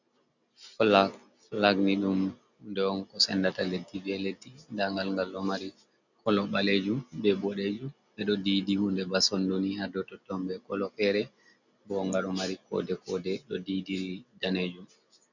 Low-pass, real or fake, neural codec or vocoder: 7.2 kHz; real; none